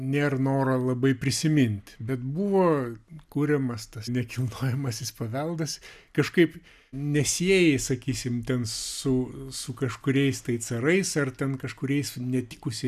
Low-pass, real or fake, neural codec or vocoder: 14.4 kHz; real; none